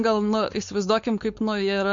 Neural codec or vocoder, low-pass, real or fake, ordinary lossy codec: codec, 16 kHz, 4.8 kbps, FACodec; 7.2 kHz; fake; MP3, 48 kbps